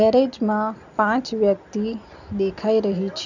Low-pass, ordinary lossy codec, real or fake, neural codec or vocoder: 7.2 kHz; none; real; none